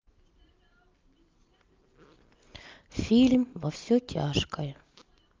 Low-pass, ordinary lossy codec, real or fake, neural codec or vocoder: 7.2 kHz; Opus, 32 kbps; real; none